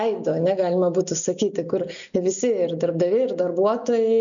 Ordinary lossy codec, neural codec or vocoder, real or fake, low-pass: MP3, 96 kbps; none; real; 7.2 kHz